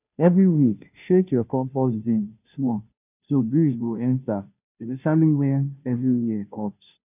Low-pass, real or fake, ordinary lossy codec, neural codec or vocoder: 3.6 kHz; fake; none; codec, 16 kHz, 0.5 kbps, FunCodec, trained on Chinese and English, 25 frames a second